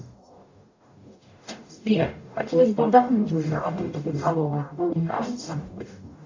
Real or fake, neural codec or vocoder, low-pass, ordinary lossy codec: fake; codec, 44.1 kHz, 0.9 kbps, DAC; 7.2 kHz; AAC, 48 kbps